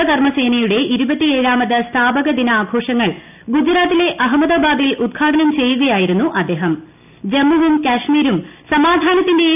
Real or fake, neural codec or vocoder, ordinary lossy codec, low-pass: real; none; none; 3.6 kHz